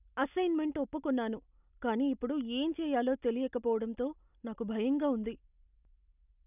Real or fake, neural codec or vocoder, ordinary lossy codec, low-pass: real; none; none; 3.6 kHz